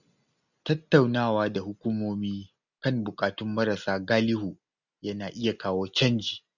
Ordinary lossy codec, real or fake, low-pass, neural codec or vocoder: none; real; 7.2 kHz; none